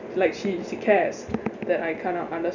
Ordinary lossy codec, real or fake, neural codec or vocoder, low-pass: none; real; none; 7.2 kHz